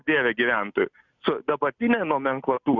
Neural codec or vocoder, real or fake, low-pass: none; real; 7.2 kHz